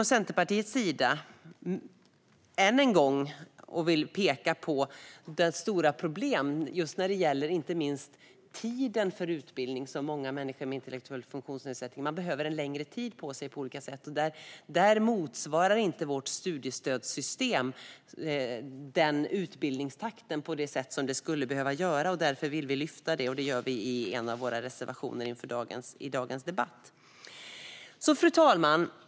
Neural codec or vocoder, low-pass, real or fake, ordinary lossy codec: none; none; real; none